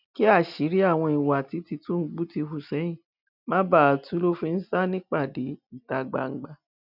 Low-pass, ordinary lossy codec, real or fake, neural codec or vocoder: 5.4 kHz; none; real; none